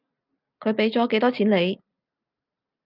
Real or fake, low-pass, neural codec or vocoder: real; 5.4 kHz; none